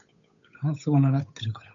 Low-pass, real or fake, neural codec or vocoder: 7.2 kHz; fake; codec, 16 kHz, 8 kbps, FunCodec, trained on Chinese and English, 25 frames a second